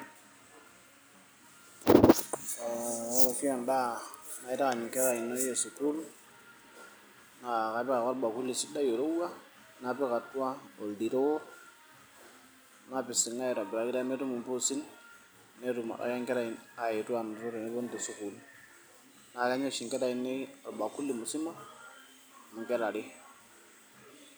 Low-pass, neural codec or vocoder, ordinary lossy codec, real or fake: none; none; none; real